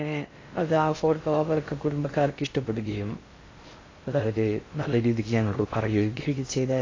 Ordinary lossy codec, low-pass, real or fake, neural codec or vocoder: AAC, 32 kbps; 7.2 kHz; fake; codec, 16 kHz in and 24 kHz out, 0.6 kbps, FocalCodec, streaming, 2048 codes